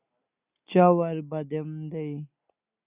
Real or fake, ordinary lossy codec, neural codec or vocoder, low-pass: real; Opus, 64 kbps; none; 3.6 kHz